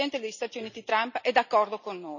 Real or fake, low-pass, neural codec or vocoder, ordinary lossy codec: real; 7.2 kHz; none; none